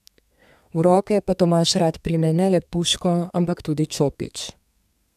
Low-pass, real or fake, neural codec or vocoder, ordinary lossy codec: 14.4 kHz; fake; codec, 32 kHz, 1.9 kbps, SNAC; none